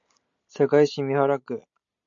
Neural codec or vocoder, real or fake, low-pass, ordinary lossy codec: none; real; 7.2 kHz; AAC, 64 kbps